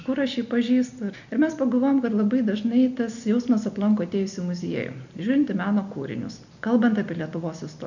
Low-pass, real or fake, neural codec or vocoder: 7.2 kHz; real; none